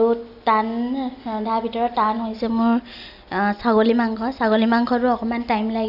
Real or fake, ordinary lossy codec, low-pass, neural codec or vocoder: real; none; 5.4 kHz; none